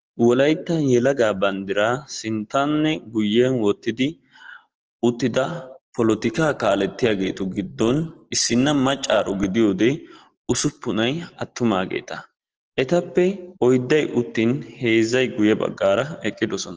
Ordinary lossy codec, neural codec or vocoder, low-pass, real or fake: Opus, 16 kbps; none; 7.2 kHz; real